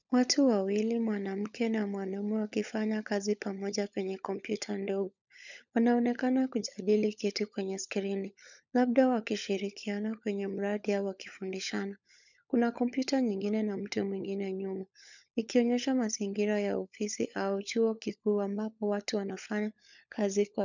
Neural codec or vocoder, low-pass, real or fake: codec, 16 kHz, 16 kbps, FunCodec, trained on LibriTTS, 50 frames a second; 7.2 kHz; fake